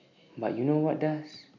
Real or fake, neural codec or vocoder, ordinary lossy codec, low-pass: real; none; MP3, 48 kbps; 7.2 kHz